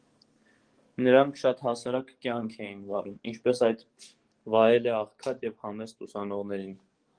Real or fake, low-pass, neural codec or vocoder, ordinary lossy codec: fake; 9.9 kHz; codec, 44.1 kHz, 7.8 kbps, DAC; Opus, 24 kbps